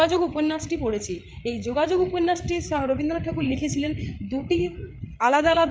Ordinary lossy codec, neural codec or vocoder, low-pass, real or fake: none; codec, 16 kHz, 8 kbps, FreqCodec, larger model; none; fake